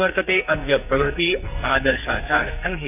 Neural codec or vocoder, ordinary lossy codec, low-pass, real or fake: codec, 44.1 kHz, 2.6 kbps, SNAC; none; 3.6 kHz; fake